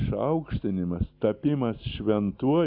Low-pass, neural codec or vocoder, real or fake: 5.4 kHz; none; real